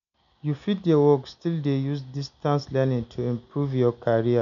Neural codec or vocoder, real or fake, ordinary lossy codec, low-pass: none; real; none; 7.2 kHz